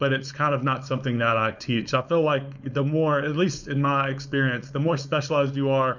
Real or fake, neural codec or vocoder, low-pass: fake; codec, 16 kHz, 4.8 kbps, FACodec; 7.2 kHz